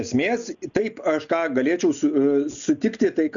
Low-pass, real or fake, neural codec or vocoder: 7.2 kHz; real; none